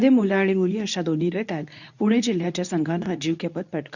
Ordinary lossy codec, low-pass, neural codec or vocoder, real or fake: none; 7.2 kHz; codec, 24 kHz, 0.9 kbps, WavTokenizer, medium speech release version 2; fake